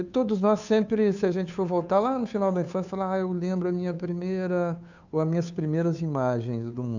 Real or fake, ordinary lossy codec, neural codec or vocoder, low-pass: fake; none; codec, 16 kHz, 2 kbps, FunCodec, trained on Chinese and English, 25 frames a second; 7.2 kHz